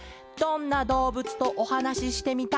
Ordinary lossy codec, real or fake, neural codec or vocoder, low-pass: none; real; none; none